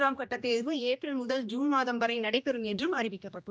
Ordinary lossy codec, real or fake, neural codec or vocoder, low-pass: none; fake; codec, 16 kHz, 1 kbps, X-Codec, HuBERT features, trained on general audio; none